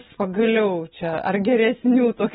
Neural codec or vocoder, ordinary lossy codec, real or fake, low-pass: none; AAC, 16 kbps; real; 19.8 kHz